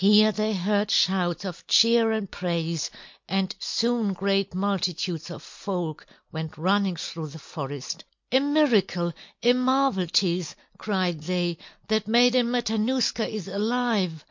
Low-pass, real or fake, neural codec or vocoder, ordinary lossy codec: 7.2 kHz; real; none; MP3, 48 kbps